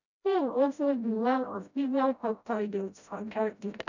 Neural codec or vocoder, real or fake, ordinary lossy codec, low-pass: codec, 16 kHz, 0.5 kbps, FreqCodec, smaller model; fake; none; 7.2 kHz